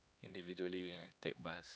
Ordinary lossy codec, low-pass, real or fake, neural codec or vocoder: none; none; fake; codec, 16 kHz, 2 kbps, X-Codec, HuBERT features, trained on LibriSpeech